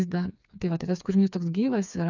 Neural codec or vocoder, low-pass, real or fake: codec, 16 kHz, 4 kbps, FreqCodec, smaller model; 7.2 kHz; fake